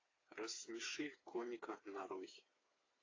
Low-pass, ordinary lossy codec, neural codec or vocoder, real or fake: 7.2 kHz; AAC, 32 kbps; codec, 16 kHz, 4 kbps, FreqCodec, smaller model; fake